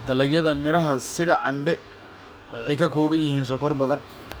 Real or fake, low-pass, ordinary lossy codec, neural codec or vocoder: fake; none; none; codec, 44.1 kHz, 2.6 kbps, DAC